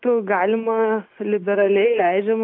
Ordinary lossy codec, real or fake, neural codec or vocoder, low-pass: AAC, 32 kbps; real; none; 5.4 kHz